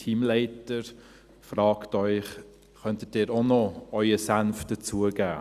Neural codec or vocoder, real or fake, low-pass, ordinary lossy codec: none; real; 14.4 kHz; Opus, 64 kbps